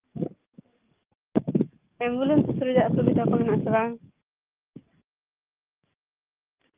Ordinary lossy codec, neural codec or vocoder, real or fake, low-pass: Opus, 32 kbps; codec, 44.1 kHz, 7.8 kbps, DAC; fake; 3.6 kHz